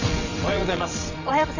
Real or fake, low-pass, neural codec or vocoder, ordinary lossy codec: fake; 7.2 kHz; vocoder, 22.05 kHz, 80 mel bands, WaveNeXt; none